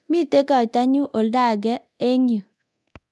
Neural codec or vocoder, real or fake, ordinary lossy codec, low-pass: codec, 24 kHz, 0.9 kbps, DualCodec; fake; none; none